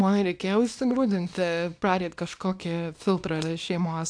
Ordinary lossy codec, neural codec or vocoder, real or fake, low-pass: AAC, 64 kbps; codec, 24 kHz, 0.9 kbps, WavTokenizer, small release; fake; 9.9 kHz